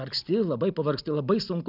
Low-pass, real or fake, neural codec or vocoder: 5.4 kHz; real; none